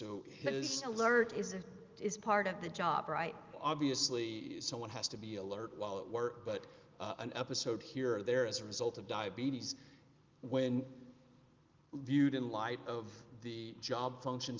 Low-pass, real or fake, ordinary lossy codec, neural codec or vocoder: 7.2 kHz; real; Opus, 32 kbps; none